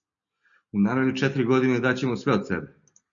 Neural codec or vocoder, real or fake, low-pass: none; real; 7.2 kHz